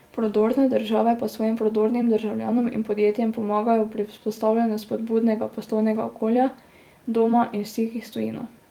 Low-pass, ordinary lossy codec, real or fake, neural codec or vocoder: 19.8 kHz; Opus, 32 kbps; fake; vocoder, 44.1 kHz, 128 mel bands every 256 samples, BigVGAN v2